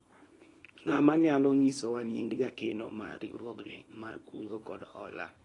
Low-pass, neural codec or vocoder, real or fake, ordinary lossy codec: 10.8 kHz; codec, 24 kHz, 0.9 kbps, WavTokenizer, small release; fake; AAC, 32 kbps